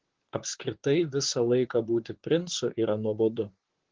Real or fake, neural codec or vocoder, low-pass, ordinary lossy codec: fake; vocoder, 44.1 kHz, 128 mel bands, Pupu-Vocoder; 7.2 kHz; Opus, 16 kbps